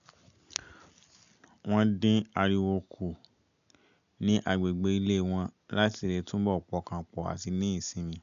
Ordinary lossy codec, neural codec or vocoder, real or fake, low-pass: MP3, 96 kbps; none; real; 7.2 kHz